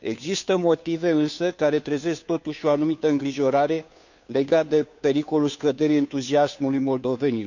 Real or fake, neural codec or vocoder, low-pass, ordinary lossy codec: fake; codec, 16 kHz, 2 kbps, FunCodec, trained on Chinese and English, 25 frames a second; 7.2 kHz; none